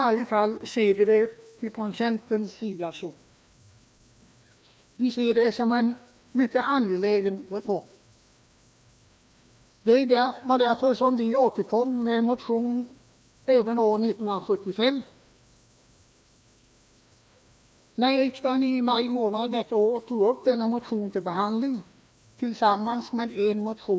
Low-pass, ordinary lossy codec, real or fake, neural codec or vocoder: none; none; fake; codec, 16 kHz, 1 kbps, FreqCodec, larger model